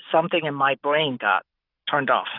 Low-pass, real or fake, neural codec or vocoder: 5.4 kHz; real; none